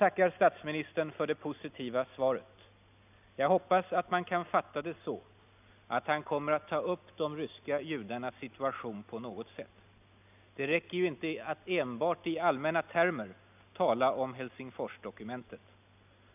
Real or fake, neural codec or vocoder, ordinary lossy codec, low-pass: real; none; none; 3.6 kHz